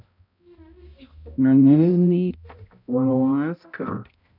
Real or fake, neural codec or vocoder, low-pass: fake; codec, 16 kHz, 0.5 kbps, X-Codec, HuBERT features, trained on balanced general audio; 5.4 kHz